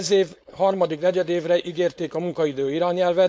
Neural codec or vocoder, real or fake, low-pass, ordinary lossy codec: codec, 16 kHz, 4.8 kbps, FACodec; fake; none; none